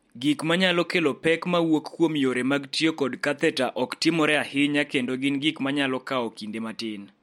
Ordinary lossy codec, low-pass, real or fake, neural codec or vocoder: MP3, 64 kbps; 19.8 kHz; real; none